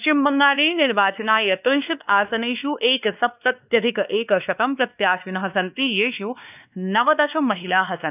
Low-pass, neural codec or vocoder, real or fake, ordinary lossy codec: 3.6 kHz; codec, 16 kHz, 2 kbps, X-Codec, WavLM features, trained on Multilingual LibriSpeech; fake; none